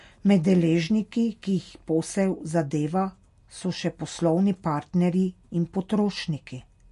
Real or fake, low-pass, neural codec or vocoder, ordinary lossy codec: real; 14.4 kHz; none; MP3, 48 kbps